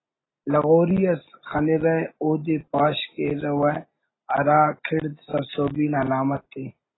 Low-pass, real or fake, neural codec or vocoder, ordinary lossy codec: 7.2 kHz; real; none; AAC, 16 kbps